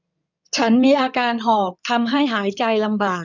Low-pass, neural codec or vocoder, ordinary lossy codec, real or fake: 7.2 kHz; codec, 16 kHz in and 24 kHz out, 2.2 kbps, FireRedTTS-2 codec; none; fake